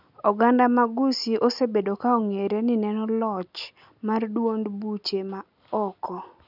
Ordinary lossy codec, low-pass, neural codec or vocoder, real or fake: none; 5.4 kHz; none; real